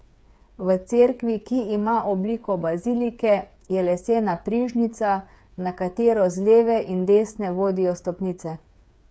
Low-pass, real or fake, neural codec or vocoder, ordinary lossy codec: none; fake; codec, 16 kHz, 8 kbps, FreqCodec, smaller model; none